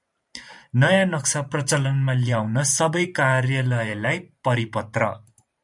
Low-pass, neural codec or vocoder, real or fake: 10.8 kHz; vocoder, 44.1 kHz, 128 mel bands every 512 samples, BigVGAN v2; fake